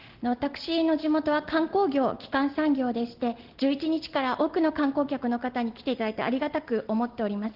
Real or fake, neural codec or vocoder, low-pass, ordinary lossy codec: real; none; 5.4 kHz; Opus, 16 kbps